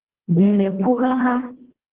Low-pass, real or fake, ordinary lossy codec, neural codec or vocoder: 3.6 kHz; fake; Opus, 16 kbps; codec, 24 kHz, 1.5 kbps, HILCodec